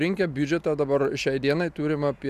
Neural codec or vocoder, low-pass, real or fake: none; 14.4 kHz; real